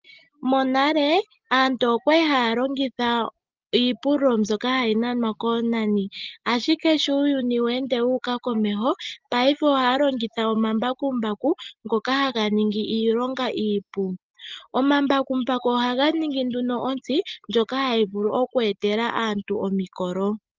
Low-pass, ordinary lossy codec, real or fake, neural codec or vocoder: 7.2 kHz; Opus, 24 kbps; real; none